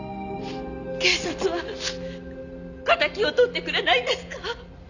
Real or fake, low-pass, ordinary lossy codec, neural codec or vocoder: real; 7.2 kHz; none; none